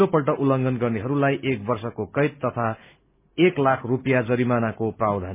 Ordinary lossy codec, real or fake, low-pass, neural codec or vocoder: MP3, 32 kbps; real; 3.6 kHz; none